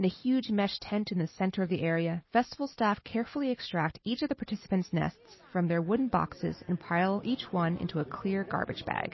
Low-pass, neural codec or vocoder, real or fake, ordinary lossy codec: 7.2 kHz; none; real; MP3, 24 kbps